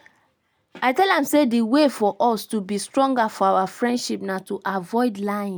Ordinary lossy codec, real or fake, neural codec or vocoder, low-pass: none; real; none; none